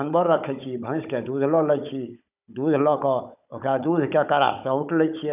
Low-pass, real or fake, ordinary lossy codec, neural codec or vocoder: 3.6 kHz; fake; none; codec, 16 kHz, 16 kbps, FunCodec, trained on Chinese and English, 50 frames a second